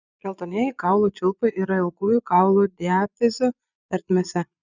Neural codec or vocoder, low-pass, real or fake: vocoder, 22.05 kHz, 80 mel bands, Vocos; 7.2 kHz; fake